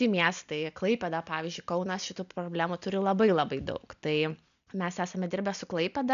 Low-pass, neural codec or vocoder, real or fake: 7.2 kHz; none; real